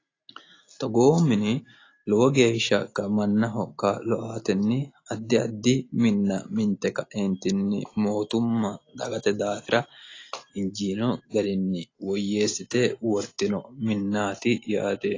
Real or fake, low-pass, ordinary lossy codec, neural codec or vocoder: real; 7.2 kHz; AAC, 32 kbps; none